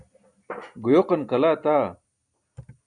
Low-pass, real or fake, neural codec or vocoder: 9.9 kHz; real; none